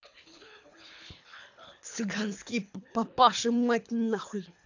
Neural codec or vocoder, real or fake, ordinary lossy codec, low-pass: codec, 24 kHz, 3 kbps, HILCodec; fake; AAC, 48 kbps; 7.2 kHz